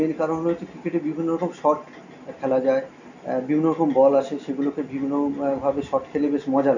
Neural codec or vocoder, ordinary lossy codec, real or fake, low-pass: none; none; real; 7.2 kHz